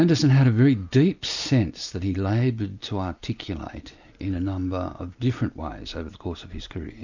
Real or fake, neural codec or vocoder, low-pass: real; none; 7.2 kHz